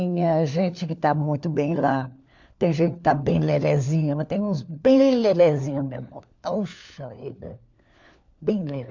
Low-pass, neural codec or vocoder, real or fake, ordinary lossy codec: 7.2 kHz; codec, 16 kHz, 4 kbps, FunCodec, trained on LibriTTS, 50 frames a second; fake; MP3, 64 kbps